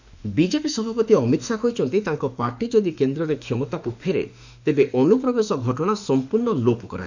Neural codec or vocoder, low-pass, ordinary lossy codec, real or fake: autoencoder, 48 kHz, 32 numbers a frame, DAC-VAE, trained on Japanese speech; 7.2 kHz; none; fake